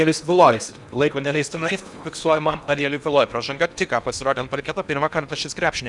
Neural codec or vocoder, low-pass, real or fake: codec, 16 kHz in and 24 kHz out, 0.8 kbps, FocalCodec, streaming, 65536 codes; 10.8 kHz; fake